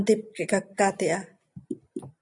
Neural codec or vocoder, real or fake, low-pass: none; real; 10.8 kHz